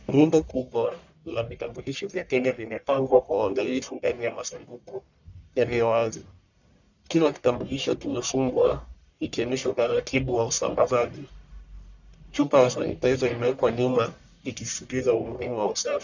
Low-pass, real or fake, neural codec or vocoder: 7.2 kHz; fake; codec, 44.1 kHz, 1.7 kbps, Pupu-Codec